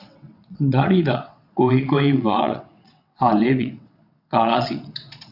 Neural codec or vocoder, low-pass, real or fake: vocoder, 22.05 kHz, 80 mel bands, WaveNeXt; 5.4 kHz; fake